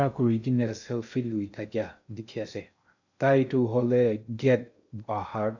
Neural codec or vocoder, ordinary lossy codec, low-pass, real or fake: codec, 16 kHz in and 24 kHz out, 0.6 kbps, FocalCodec, streaming, 2048 codes; none; 7.2 kHz; fake